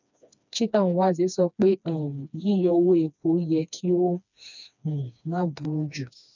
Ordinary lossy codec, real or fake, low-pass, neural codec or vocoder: none; fake; 7.2 kHz; codec, 16 kHz, 2 kbps, FreqCodec, smaller model